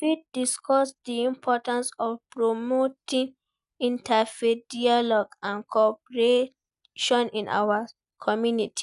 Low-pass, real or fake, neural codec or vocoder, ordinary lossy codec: 10.8 kHz; real; none; none